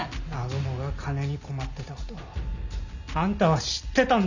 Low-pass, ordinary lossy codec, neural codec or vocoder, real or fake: 7.2 kHz; none; none; real